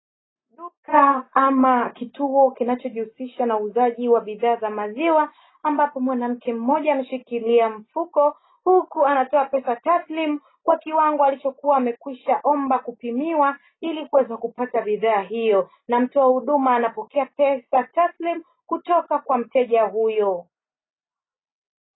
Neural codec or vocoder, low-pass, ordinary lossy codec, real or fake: none; 7.2 kHz; AAC, 16 kbps; real